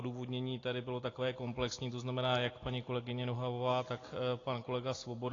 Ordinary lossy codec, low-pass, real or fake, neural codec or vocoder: AAC, 32 kbps; 7.2 kHz; real; none